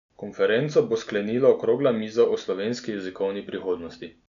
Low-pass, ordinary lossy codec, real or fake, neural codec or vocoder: 7.2 kHz; none; real; none